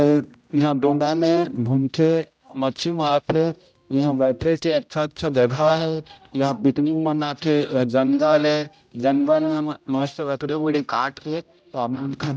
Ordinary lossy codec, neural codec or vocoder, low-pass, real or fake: none; codec, 16 kHz, 0.5 kbps, X-Codec, HuBERT features, trained on general audio; none; fake